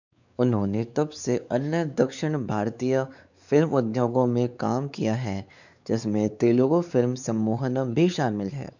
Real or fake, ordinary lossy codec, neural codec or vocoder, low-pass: fake; none; codec, 16 kHz, 4 kbps, X-Codec, WavLM features, trained on Multilingual LibriSpeech; 7.2 kHz